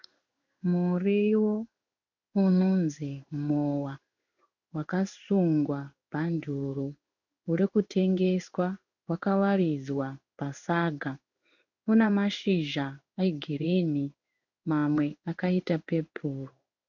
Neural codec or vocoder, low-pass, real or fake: codec, 16 kHz in and 24 kHz out, 1 kbps, XY-Tokenizer; 7.2 kHz; fake